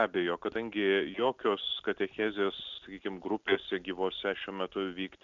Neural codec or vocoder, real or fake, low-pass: none; real; 7.2 kHz